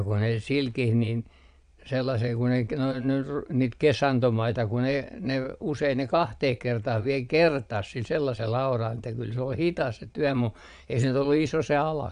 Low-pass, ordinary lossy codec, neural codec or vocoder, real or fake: 9.9 kHz; none; vocoder, 22.05 kHz, 80 mel bands, Vocos; fake